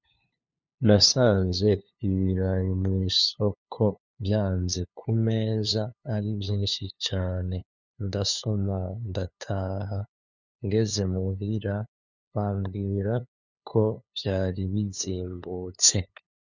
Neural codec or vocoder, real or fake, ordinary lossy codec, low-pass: codec, 16 kHz, 2 kbps, FunCodec, trained on LibriTTS, 25 frames a second; fake; Opus, 64 kbps; 7.2 kHz